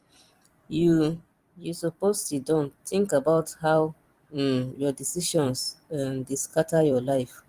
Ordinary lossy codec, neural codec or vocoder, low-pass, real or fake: Opus, 24 kbps; vocoder, 44.1 kHz, 128 mel bands every 256 samples, BigVGAN v2; 14.4 kHz; fake